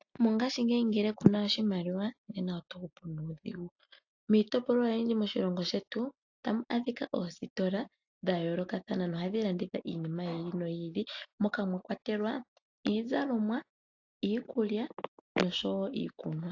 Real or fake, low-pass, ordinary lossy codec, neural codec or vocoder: real; 7.2 kHz; AAC, 48 kbps; none